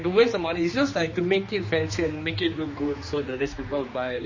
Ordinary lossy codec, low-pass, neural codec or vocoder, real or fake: MP3, 32 kbps; 7.2 kHz; codec, 16 kHz, 2 kbps, X-Codec, HuBERT features, trained on general audio; fake